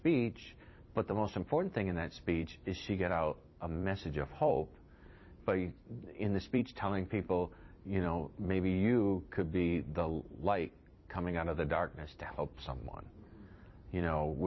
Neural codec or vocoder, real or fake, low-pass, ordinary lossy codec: vocoder, 44.1 kHz, 128 mel bands every 256 samples, BigVGAN v2; fake; 7.2 kHz; MP3, 24 kbps